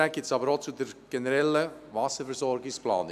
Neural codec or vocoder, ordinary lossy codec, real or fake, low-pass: none; none; real; 14.4 kHz